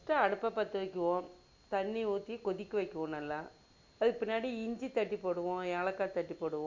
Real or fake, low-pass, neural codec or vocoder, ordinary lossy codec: real; 7.2 kHz; none; MP3, 48 kbps